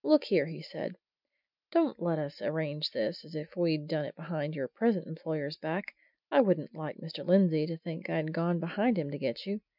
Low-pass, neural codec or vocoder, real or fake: 5.4 kHz; none; real